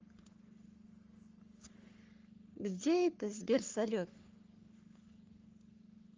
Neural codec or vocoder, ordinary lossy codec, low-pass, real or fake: codec, 44.1 kHz, 3.4 kbps, Pupu-Codec; Opus, 32 kbps; 7.2 kHz; fake